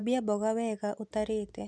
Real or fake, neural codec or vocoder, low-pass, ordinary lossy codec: real; none; none; none